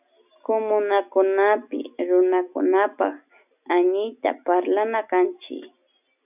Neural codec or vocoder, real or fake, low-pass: none; real; 3.6 kHz